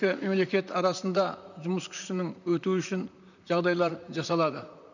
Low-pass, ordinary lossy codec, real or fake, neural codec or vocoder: 7.2 kHz; none; fake; vocoder, 44.1 kHz, 128 mel bands, Pupu-Vocoder